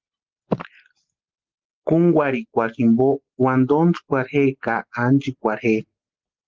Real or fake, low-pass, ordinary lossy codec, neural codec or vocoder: real; 7.2 kHz; Opus, 16 kbps; none